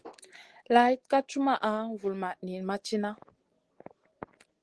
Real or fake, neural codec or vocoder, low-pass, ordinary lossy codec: real; none; 10.8 kHz; Opus, 16 kbps